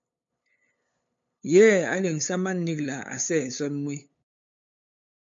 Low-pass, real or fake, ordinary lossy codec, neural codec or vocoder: 7.2 kHz; fake; MP3, 48 kbps; codec, 16 kHz, 8 kbps, FunCodec, trained on LibriTTS, 25 frames a second